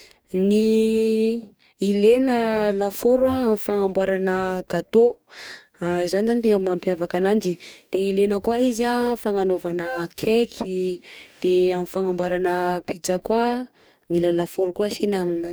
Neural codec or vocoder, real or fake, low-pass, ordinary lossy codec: codec, 44.1 kHz, 2.6 kbps, DAC; fake; none; none